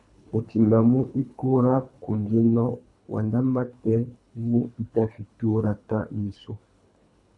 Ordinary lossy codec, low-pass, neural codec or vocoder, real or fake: AAC, 64 kbps; 10.8 kHz; codec, 24 kHz, 3 kbps, HILCodec; fake